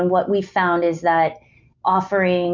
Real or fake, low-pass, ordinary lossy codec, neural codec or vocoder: real; 7.2 kHz; MP3, 64 kbps; none